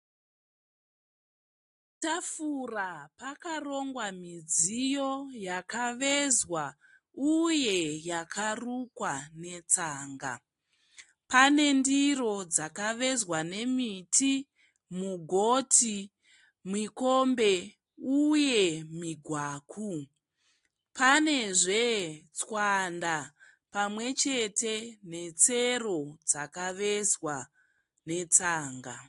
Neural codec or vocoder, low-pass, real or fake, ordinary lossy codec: none; 10.8 kHz; real; AAC, 48 kbps